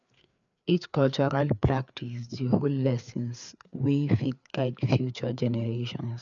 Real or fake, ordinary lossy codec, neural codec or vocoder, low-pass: fake; none; codec, 16 kHz, 4 kbps, FreqCodec, larger model; 7.2 kHz